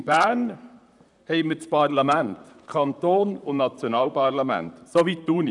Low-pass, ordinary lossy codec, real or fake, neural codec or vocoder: 10.8 kHz; none; fake; vocoder, 44.1 kHz, 128 mel bands, Pupu-Vocoder